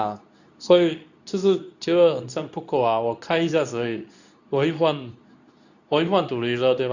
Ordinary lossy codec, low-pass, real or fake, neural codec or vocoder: none; 7.2 kHz; fake; codec, 24 kHz, 0.9 kbps, WavTokenizer, medium speech release version 2